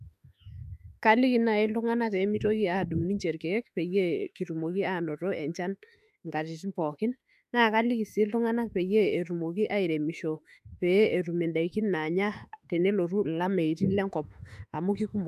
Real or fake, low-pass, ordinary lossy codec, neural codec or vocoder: fake; 14.4 kHz; none; autoencoder, 48 kHz, 32 numbers a frame, DAC-VAE, trained on Japanese speech